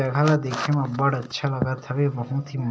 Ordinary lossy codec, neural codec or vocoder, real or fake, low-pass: none; none; real; none